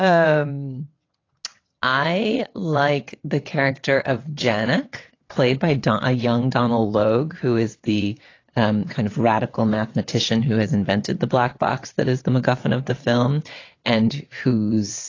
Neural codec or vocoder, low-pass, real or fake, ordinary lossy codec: vocoder, 22.05 kHz, 80 mel bands, WaveNeXt; 7.2 kHz; fake; AAC, 32 kbps